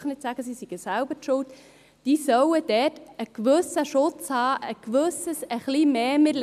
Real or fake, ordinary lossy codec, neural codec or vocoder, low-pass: real; none; none; 14.4 kHz